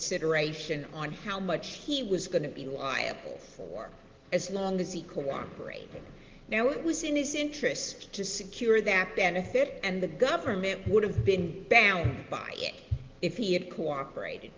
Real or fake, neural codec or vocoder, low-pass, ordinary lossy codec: real; none; 7.2 kHz; Opus, 16 kbps